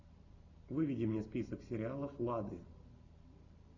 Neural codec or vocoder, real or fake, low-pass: none; real; 7.2 kHz